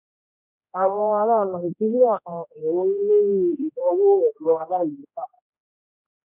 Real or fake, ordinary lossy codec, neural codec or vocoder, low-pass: fake; none; codec, 16 kHz, 1 kbps, X-Codec, HuBERT features, trained on general audio; 3.6 kHz